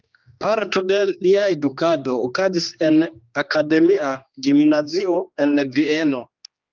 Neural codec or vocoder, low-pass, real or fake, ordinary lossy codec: codec, 16 kHz, 2 kbps, X-Codec, HuBERT features, trained on general audio; 7.2 kHz; fake; Opus, 24 kbps